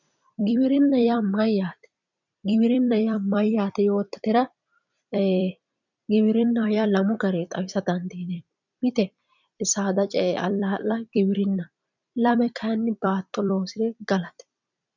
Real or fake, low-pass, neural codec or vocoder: fake; 7.2 kHz; vocoder, 44.1 kHz, 128 mel bands every 256 samples, BigVGAN v2